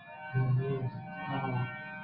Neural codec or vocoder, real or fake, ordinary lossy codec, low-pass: none; real; Opus, 64 kbps; 5.4 kHz